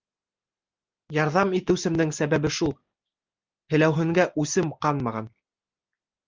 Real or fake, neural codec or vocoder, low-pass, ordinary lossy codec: real; none; 7.2 kHz; Opus, 24 kbps